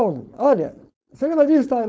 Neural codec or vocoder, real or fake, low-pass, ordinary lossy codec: codec, 16 kHz, 4.8 kbps, FACodec; fake; none; none